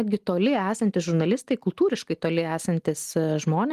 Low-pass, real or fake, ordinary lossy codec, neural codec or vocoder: 14.4 kHz; real; Opus, 24 kbps; none